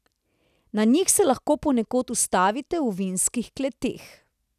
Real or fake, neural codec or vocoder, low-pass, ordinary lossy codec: real; none; 14.4 kHz; none